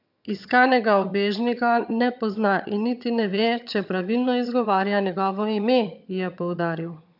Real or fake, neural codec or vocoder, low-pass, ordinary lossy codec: fake; vocoder, 22.05 kHz, 80 mel bands, HiFi-GAN; 5.4 kHz; none